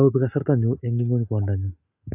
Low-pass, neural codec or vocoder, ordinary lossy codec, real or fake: 3.6 kHz; none; AAC, 32 kbps; real